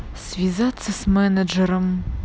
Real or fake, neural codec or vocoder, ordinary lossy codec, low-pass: real; none; none; none